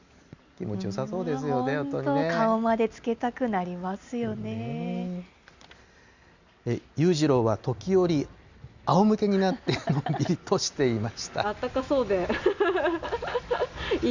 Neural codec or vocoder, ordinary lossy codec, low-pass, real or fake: none; Opus, 64 kbps; 7.2 kHz; real